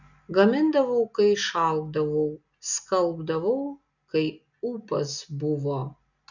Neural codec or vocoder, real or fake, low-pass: none; real; 7.2 kHz